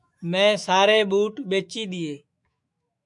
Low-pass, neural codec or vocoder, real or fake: 10.8 kHz; autoencoder, 48 kHz, 128 numbers a frame, DAC-VAE, trained on Japanese speech; fake